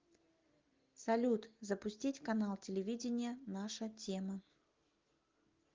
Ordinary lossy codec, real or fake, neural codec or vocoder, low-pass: Opus, 32 kbps; real; none; 7.2 kHz